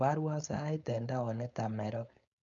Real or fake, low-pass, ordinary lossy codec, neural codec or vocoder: fake; 7.2 kHz; none; codec, 16 kHz, 4.8 kbps, FACodec